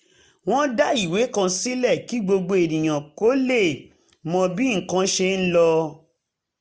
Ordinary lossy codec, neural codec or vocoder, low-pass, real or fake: none; none; none; real